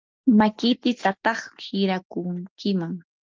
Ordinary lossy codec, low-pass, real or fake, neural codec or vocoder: Opus, 32 kbps; 7.2 kHz; real; none